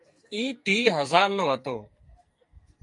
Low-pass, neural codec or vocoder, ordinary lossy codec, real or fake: 10.8 kHz; codec, 44.1 kHz, 2.6 kbps, SNAC; MP3, 48 kbps; fake